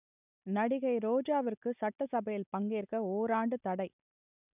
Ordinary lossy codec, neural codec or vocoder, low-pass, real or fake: none; none; 3.6 kHz; real